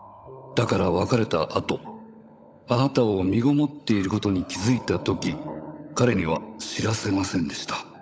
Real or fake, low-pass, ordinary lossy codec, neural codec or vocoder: fake; none; none; codec, 16 kHz, 16 kbps, FunCodec, trained on LibriTTS, 50 frames a second